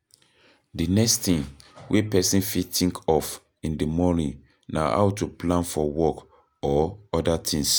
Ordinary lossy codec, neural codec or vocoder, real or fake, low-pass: none; none; real; none